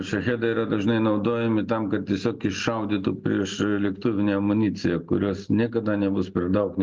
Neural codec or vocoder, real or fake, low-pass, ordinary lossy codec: none; real; 7.2 kHz; Opus, 24 kbps